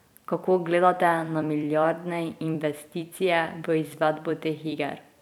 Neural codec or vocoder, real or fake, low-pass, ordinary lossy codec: vocoder, 44.1 kHz, 128 mel bands every 256 samples, BigVGAN v2; fake; 19.8 kHz; none